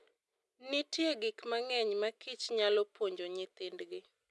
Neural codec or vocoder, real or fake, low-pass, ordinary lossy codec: none; real; none; none